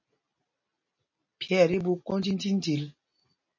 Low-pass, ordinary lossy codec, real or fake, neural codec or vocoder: 7.2 kHz; MP3, 32 kbps; real; none